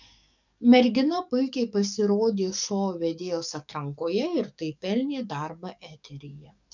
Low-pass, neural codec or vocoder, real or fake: 7.2 kHz; codec, 44.1 kHz, 7.8 kbps, DAC; fake